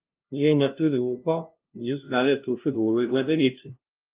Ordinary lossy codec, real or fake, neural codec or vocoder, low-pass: Opus, 32 kbps; fake; codec, 16 kHz, 0.5 kbps, FunCodec, trained on LibriTTS, 25 frames a second; 3.6 kHz